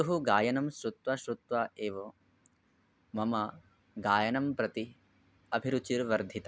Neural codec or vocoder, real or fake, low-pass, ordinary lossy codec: none; real; none; none